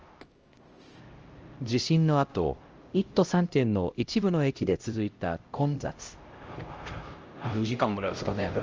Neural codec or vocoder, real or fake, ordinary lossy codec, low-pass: codec, 16 kHz, 0.5 kbps, X-Codec, HuBERT features, trained on LibriSpeech; fake; Opus, 24 kbps; 7.2 kHz